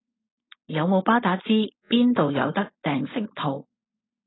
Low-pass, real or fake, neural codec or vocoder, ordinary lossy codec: 7.2 kHz; fake; codec, 16 kHz, 4.8 kbps, FACodec; AAC, 16 kbps